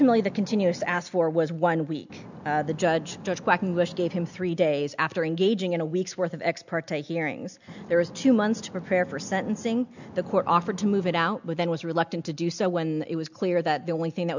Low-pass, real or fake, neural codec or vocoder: 7.2 kHz; real; none